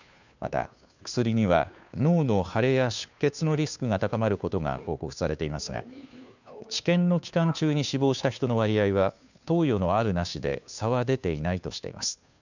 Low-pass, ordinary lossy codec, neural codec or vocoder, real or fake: 7.2 kHz; none; codec, 16 kHz, 2 kbps, FunCodec, trained on Chinese and English, 25 frames a second; fake